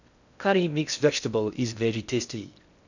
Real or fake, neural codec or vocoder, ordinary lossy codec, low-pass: fake; codec, 16 kHz in and 24 kHz out, 0.6 kbps, FocalCodec, streaming, 2048 codes; none; 7.2 kHz